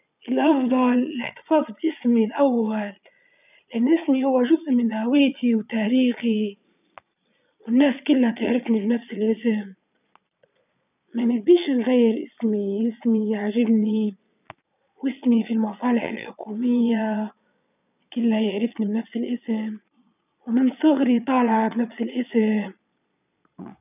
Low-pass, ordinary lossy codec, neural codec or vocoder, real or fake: 3.6 kHz; none; vocoder, 22.05 kHz, 80 mel bands, Vocos; fake